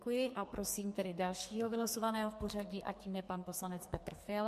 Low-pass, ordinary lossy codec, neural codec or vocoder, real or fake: 14.4 kHz; MP3, 64 kbps; codec, 44.1 kHz, 2.6 kbps, SNAC; fake